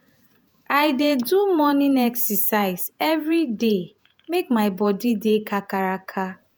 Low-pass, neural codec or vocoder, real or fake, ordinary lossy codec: none; vocoder, 48 kHz, 128 mel bands, Vocos; fake; none